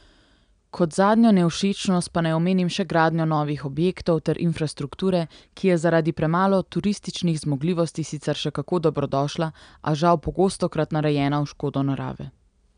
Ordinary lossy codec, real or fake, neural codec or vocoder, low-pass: none; real; none; 9.9 kHz